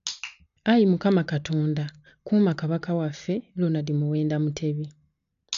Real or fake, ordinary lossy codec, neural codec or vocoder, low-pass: real; none; none; 7.2 kHz